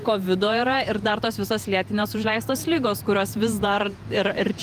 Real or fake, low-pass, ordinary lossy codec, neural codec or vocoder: fake; 14.4 kHz; Opus, 32 kbps; vocoder, 48 kHz, 128 mel bands, Vocos